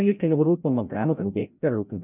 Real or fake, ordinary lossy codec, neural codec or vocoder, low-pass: fake; none; codec, 16 kHz, 0.5 kbps, FreqCodec, larger model; 3.6 kHz